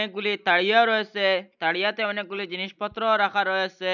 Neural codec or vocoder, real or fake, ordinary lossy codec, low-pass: none; real; none; 7.2 kHz